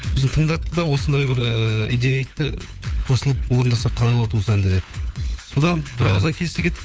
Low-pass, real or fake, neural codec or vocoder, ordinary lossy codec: none; fake; codec, 16 kHz, 4 kbps, FunCodec, trained on LibriTTS, 50 frames a second; none